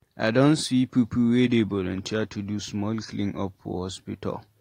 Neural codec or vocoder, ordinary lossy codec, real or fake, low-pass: none; AAC, 48 kbps; real; 19.8 kHz